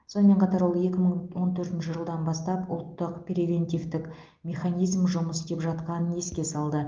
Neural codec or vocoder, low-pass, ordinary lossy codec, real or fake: none; 7.2 kHz; Opus, 32 kbps; real